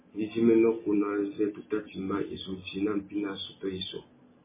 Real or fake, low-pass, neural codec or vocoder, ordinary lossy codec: real; 3.6 kHz; none; MP3, 16 kbps